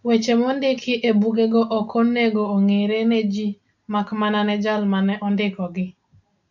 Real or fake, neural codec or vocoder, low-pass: real; none; 7.2 kHz